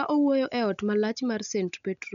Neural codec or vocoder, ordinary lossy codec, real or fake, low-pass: none; none; real; 7.2 kHz